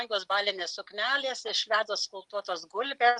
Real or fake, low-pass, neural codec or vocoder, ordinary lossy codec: real; 10.8 kHz; none; Opus, 32 kbps